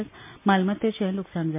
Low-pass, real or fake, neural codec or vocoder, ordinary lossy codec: 3.6 kHz; real; none; MP3, 24 kbps